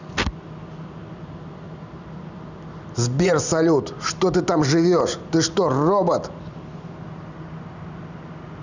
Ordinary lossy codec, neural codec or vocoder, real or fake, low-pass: none; none; real; 7.2 kHz